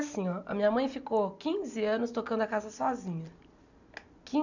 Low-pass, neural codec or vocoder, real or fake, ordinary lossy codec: 7.2 kHz; none; real; none